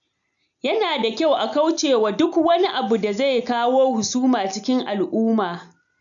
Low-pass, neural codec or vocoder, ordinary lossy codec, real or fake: 7.2 kHz; none; none; real